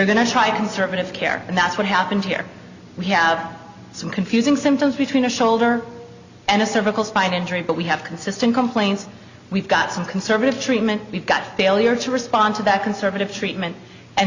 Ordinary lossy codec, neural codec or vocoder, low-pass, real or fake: Opus, 64 kbps; none; 7.2 kHz; real